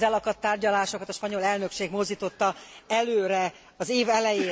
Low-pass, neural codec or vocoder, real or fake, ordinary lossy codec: none; none; real; none